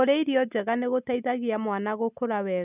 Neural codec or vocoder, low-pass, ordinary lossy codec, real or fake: none; 3.6 kHz; none; real